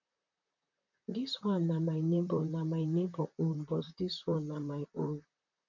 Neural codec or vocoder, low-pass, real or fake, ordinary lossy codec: vocoder, 44.1 kHz, 128 mel bands, Pupu-Vocoder; 7.2 kHz; fake; none